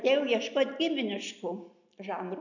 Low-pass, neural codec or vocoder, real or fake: 7.2 kHz; none; real